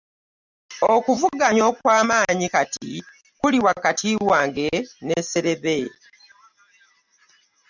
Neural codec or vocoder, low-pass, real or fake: none; 7.2 kHz; real